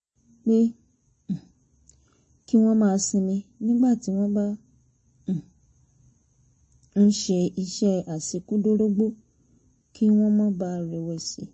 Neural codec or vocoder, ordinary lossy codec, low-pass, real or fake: none; MP3, 32 kbps; 10.8 kHz; real